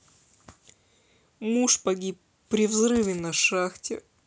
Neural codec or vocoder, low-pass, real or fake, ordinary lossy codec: none; none; real; none